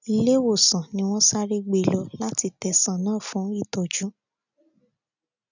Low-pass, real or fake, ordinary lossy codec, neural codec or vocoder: 7.2 kHz; real; none; none